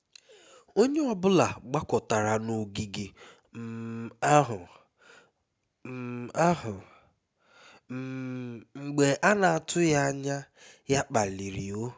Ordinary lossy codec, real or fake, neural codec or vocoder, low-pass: none; real; none; none